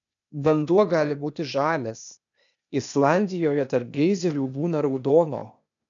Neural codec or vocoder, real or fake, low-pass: codec, 16 kHz, 0.8 kbps, ZipCodec; fake; 7.2 kHz